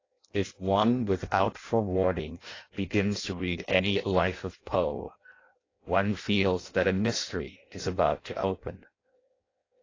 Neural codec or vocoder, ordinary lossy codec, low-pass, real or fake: codec, 16 kHz in and 24 kHz out, 0.6 kbps, FireRedTTS-2 codec; AAC, 32 kbps; 7.2 kHz; fake